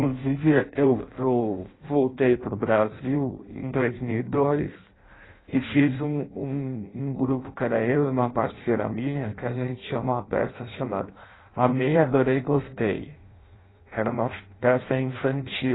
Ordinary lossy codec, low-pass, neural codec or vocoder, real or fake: AAC, 16 kbps; 7.2 kHz; codec, 16 kHz in and 24 kHz out, 0.6 kbps, FireRedTTS-2 codec; fake